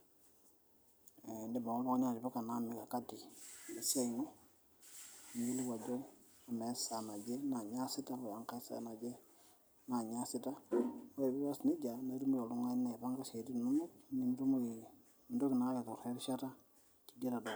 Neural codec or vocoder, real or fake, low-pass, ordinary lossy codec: vocoder, 44.1 kHz, 128 mel bands every 256 samples, BigVGAN v2; fake; none; none